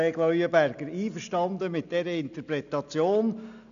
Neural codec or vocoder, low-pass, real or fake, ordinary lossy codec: none; 7.2 kHz; real; none